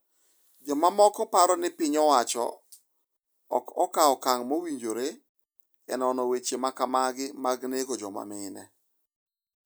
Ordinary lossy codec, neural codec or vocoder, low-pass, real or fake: none; none; none; real